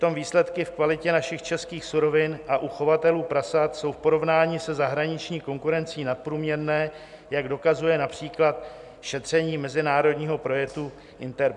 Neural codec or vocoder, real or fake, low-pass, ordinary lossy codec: none; real; 10.8 kHz; MP3, 96 kbps